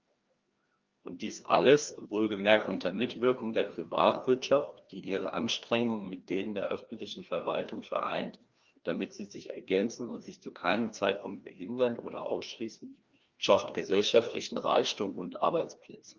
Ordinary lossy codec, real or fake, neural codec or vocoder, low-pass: Opus, 32 kbps; fake; codec, 16 kHz, 1 kbps, FreqCodec, larger model; 7.2 kHz